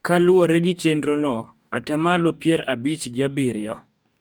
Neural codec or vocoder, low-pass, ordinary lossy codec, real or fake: codec, 44.1 kHz, 2.6 kbps, DAC; none; none; fake